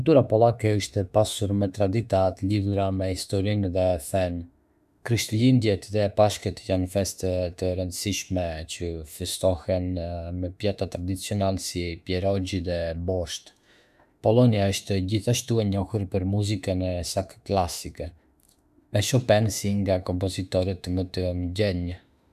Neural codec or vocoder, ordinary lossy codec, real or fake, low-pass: autoencoder, 48 kHz, 32 numbers a frame, DAC-VAE, trained on Japanese speech; none; fake; 14.4 kHz